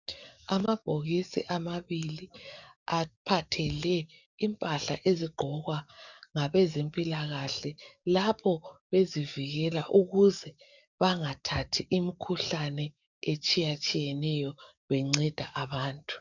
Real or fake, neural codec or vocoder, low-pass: fake; codec, 44.1 kHz, 7.8 kbps, DAC; 7.2 kHz